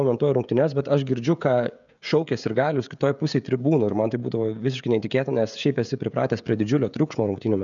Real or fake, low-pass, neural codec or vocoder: fake; 7.2 kHz; codec, 16 kHz, 16 kbps, FreqCodec, smaller model